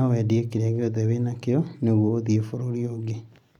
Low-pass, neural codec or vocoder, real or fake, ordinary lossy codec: 19.8 kHz; none; real; none